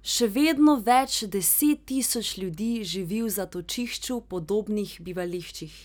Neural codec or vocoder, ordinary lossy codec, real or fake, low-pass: none; none; real; none